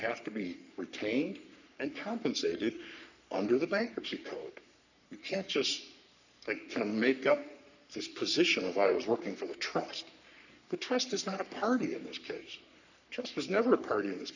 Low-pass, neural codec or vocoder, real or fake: 7.2 kHz; codec, 44.1 kHz, 3.4 kbps, Pupu-Codec; fake